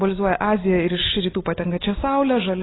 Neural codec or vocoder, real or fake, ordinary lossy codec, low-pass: none; real; AAC, 16 kbps; 7.2 kHz